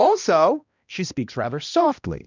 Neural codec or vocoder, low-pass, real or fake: codec, 16 kHz, 1 kbps, X-Codec, HuBERT features, trained on balanced general audio; 7.2 kHz; fake